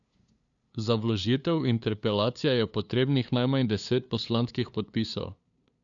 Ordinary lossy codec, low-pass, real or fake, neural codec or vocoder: none; 7.2 kHz; fake; codec, 16 kHz, 2 kbps, FunCodec, trained on LibriTTS, 25 frames a second